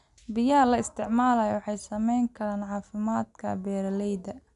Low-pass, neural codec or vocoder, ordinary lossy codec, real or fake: 10.8 kHz; none; none; real